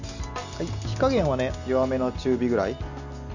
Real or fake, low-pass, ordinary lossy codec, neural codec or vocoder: real; 7.2 kHz; none; none